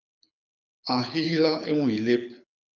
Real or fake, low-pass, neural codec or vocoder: fake; 7.2 kHz; codec, 24 kHz, 6 kbps, HILCodec